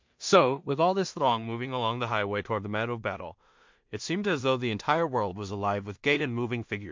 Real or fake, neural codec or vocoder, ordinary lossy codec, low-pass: fake; codec, 16 kHz in and 24 kHz out, 0.4 kbps, LongCat-Audio-Codec, two codebook decoder; MP3, 48 kbps; 7.2 kHz